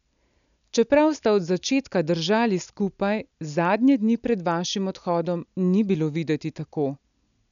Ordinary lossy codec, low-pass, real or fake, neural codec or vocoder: none; 7.2 kHz; real; none